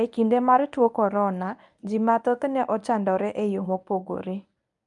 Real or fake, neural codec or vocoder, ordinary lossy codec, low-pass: fake; codec, 24 kHz, 0.9 kbps, WavTokenizer, medium speech release version 1; none; 10.8 kHz